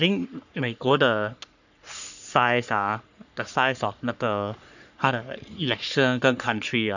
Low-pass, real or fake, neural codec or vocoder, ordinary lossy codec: 7.2 kHz; fake; codec, 44.1 kHz, 3.4 kbps, Pupu-Codec; none